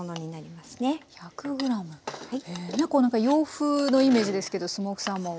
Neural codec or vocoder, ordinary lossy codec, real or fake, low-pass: none; none; real; none